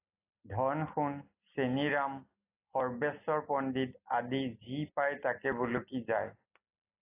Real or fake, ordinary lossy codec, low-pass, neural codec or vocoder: real; AAC, 24 kbps; 3.6 kHz; none